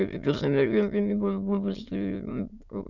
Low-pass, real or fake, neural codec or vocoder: 7.2 kHz; fake; autoencoder, 22.05 kHz, a latent of 192 numbers a frame, VITS, trained on many speakers